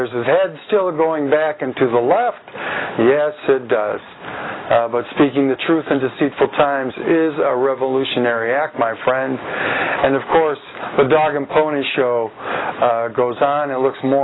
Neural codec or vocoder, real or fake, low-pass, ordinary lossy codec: none; real; 7.2 kHz; AAC, 16 kbps